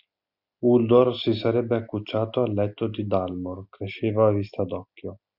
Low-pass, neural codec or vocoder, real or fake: 5.4 kHz; none; real